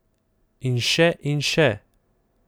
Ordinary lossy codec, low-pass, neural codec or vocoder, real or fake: none; none; none; real